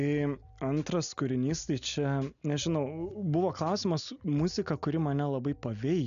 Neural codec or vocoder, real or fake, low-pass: none; real; 7.2 kHz